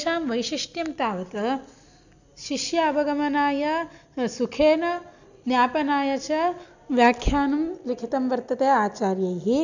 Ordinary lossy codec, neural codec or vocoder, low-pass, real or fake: none; none; 7.2 kHz; real